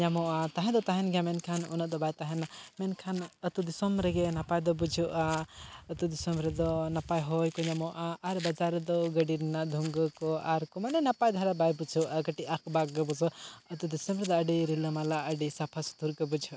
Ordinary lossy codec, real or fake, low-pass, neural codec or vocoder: none; real; none; none